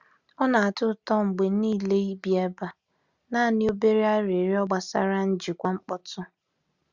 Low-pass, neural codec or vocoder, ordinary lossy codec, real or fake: 7.2 kHz; none; Opus, 64 kbps; real